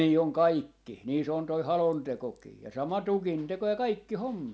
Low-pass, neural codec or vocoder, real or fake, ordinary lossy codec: none; none; real; none